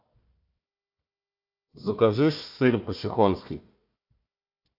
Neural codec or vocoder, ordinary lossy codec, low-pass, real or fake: codec, 16 kHz, 1 kbps, FunCodec, trained on Chinese and English, 50 frames a second; AAC, 48 kbps; 5.4 kHz; fake